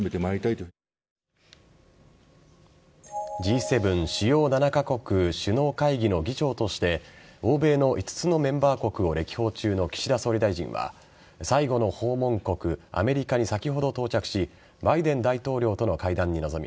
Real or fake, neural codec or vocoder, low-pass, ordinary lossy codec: real; none; none; none